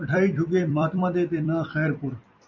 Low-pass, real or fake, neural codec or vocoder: 7.2 kHz; fake; vocoder, 44.1 kHz, 128 mel bands every 256 samples, BigVGAN v2